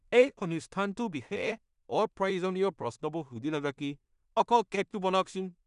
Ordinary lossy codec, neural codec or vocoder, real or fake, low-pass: none; codec, 16 kHz in and 24 kHz out, 0.4 kbps, LongCat-Audio-Codec, two codebook decoder; fake; 10.8 kHz